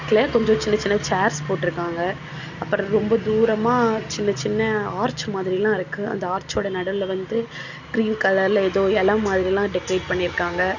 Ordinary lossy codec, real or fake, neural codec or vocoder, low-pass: none; real; none; 7.2 kHz